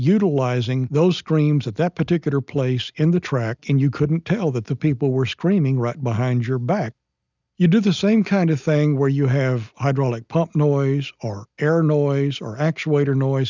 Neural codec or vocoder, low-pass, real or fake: none; 7.2 kHz; real